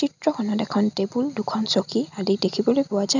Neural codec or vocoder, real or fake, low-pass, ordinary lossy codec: none; real; 7.2 kHz; none